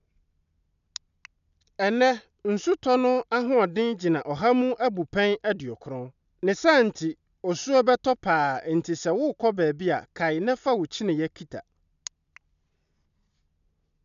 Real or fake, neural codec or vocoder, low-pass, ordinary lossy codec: real; none; 7.2 kHz; none